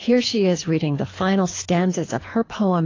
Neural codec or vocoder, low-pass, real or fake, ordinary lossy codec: codec, 24 kHz, 3 kbps, HILCodec; 7.2 kHz; fake; AAC, 32 kbps